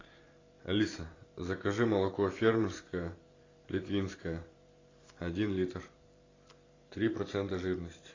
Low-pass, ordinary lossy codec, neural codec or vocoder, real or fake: 7.2 kHz; AAC, 32 kbps; vocoder, 44.1 kHz, 128 mel bands every 512 samples, BigVGAN v2; fake